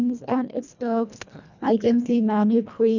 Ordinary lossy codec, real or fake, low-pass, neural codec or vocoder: none; fake; 7.2 kHz; codec, 24 kHz, 1.5 kbps, HILCodec